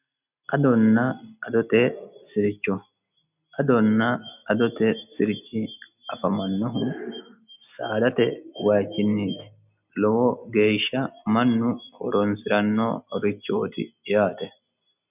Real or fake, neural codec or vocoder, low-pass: real; none; 3.6 kHz